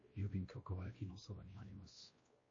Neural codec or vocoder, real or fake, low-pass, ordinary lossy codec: codec, 16 kHz, 1 kbps, X-Codec, WavLM features, trained on Multilingual LibriSpeech; fake; 7.2 kHz; MP3, 32 kbps